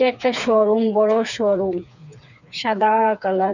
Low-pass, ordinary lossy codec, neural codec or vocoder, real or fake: 7.2 kHz; none; codec, 16 kHz, 4 kbps, FreqCodec, smaller model; fake